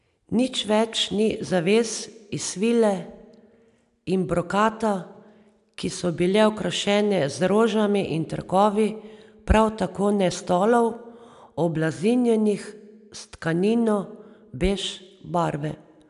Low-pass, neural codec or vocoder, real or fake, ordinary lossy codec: 10.8 kHz; none; real; none